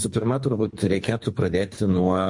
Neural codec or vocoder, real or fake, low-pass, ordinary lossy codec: codec, 24 kHz, 3 kbps, HILCodec; fake; 10.8 kHz; MP3, 48 kbps